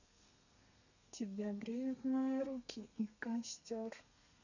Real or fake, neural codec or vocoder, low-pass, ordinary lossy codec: fake; codec, 32 kHz, 1.9 kbps, SNAC; 7.2 kHz; none